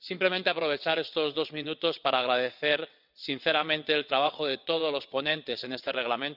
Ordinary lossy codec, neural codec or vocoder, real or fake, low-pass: none; vocoder, 22.05 kHz, 80 mel bands, WaveNeXt; fake; 5.4 kHz